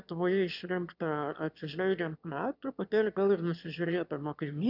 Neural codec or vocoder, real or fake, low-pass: autoencoder, 22.05 kHz, a latent of 192 numbers a frame, VITS, trained on one speaker; fake; 5.4 kHz